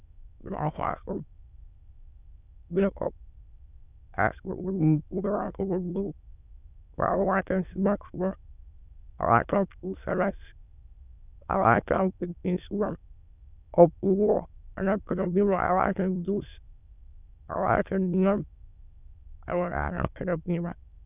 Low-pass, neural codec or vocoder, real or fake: 3.6 kHz; autoencoder, 22.05 kHz, a latent of 192 numbers a frame, VITS, trained on many speakers; fake